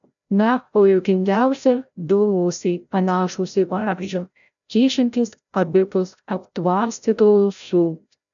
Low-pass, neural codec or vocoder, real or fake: 7.2 kHz; codec, 16 kHz, 0.5 kbps, FreqCodec, larger model; fake